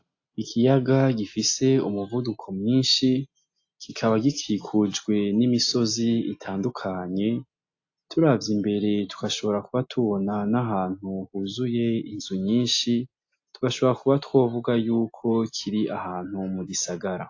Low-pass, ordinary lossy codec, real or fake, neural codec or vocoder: 7.2 kHz; AAC, 48 kbps; real; none